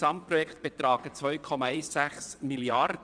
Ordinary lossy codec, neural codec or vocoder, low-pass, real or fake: none; vocoder, 22.05 kHz, 80 mel bands, WaveNeXt; 9.9 kHz; fake